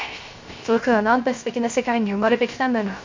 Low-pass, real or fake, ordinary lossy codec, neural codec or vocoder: 7.2 kHz; fake; MP3, 48 kbps; codec, 16 kHz, 0.3 kbps, FocalCodec